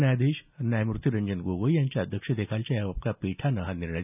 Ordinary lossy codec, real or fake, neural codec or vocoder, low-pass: none; real; none; 3.6 kHz